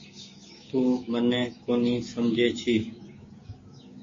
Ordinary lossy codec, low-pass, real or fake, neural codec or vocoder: MP3, 32 kbps; 7.2 kHz; fake; codec, 16 kHz, 6 kbps, DAC